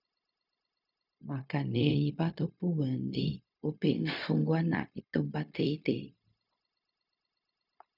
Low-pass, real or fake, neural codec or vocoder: 5.4 kHz; fake; codec, 16 kHz, 0.4 kbps, LongCat-Audio-Codec